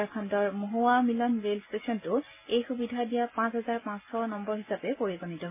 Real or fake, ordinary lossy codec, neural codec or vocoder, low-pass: real; MP3, 32 kbps; none; 3.6 kHz